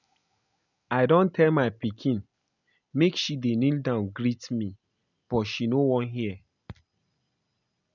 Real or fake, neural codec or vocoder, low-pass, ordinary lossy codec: real; none; 7.2 kHz; none